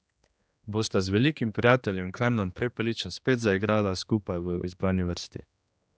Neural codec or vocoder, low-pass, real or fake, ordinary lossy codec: codec, 16 kHz, 2 kbps, X-Codec, HuBERT features, trained on general audio; none; fake; none